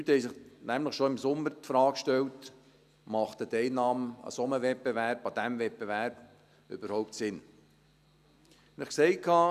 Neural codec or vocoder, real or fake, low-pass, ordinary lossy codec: none; real; 14.4 kHz; none